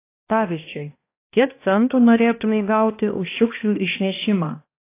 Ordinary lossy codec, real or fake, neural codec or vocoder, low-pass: AAC, 24 kbps; fake; codec, 16 kHz, 1 kbps, X-Codec, HuBERT features, trained on balanced general audio; 3.6 kHz